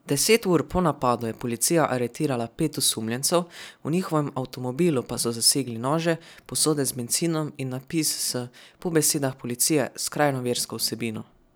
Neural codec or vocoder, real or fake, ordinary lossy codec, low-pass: none; real; none; none